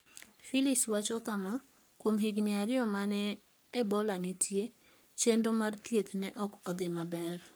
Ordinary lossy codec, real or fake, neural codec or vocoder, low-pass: none; fake; codec, 44.1 kHz, 3.4 kbps, Pupu-Codec; none